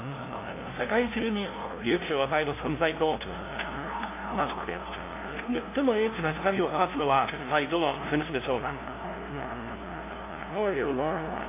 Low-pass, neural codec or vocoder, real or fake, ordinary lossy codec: 3.6 kHz; codec, 16 kHz, 0.5 kbps, FunCodec, trained on LibriTTS, 25 frames a second; fake; AAC, 24 kbps